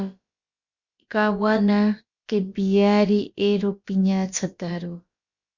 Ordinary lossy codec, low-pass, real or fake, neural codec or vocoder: Opus, 64 kbps; 7.2 kHz; fake; codec, 16 kHz, about 1 kbps, DyCAST, with the encoder's durations